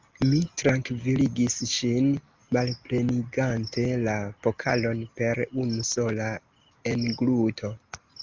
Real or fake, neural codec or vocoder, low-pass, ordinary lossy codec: real; none; 7.2 kHz; Opus, 32 kbps